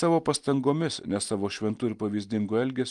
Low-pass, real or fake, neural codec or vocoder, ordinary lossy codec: 10.8 kHz; real; none; Opus, 32 kbps